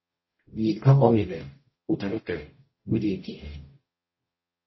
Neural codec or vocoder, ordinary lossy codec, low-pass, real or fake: codec, 44.1 kHz, 0.9 kbps, DAC; MP3, 24 kbps; 7.2 kHz; fake